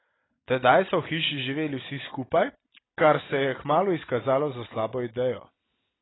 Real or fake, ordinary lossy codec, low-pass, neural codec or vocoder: real; AAC, 16 kbps; 7.2 kHz; none